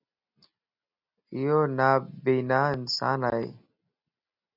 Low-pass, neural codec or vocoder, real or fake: 5.4 kHz; none; real